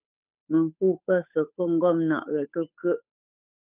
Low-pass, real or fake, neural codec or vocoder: 3.6 kHz; fake; codec, 16 kHz, 8 kbps, FunCodec, trained on Chinese and English, 25 frames a second